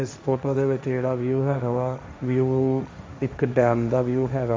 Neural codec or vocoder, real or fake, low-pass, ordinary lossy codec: codec, 16 kHz, 1.1 kbps, Voila-Tokenizer; fake; none; none